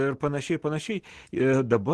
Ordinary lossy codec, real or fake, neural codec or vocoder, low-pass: Opus, 16 kbps; real; none; 10.8 kHz